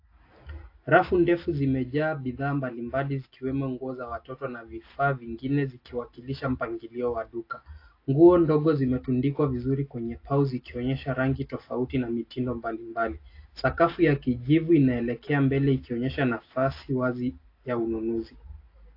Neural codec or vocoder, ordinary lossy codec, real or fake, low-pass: none; AAC, 32 kbps; real; 5.4 kHz